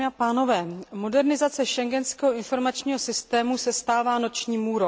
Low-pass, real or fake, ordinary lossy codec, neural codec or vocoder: none; real; none; none